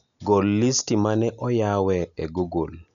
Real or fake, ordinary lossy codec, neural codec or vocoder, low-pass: real; none; none; 7.2 kHz